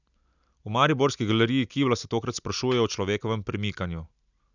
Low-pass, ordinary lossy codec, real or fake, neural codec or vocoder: 7.2 kHz; none; real; none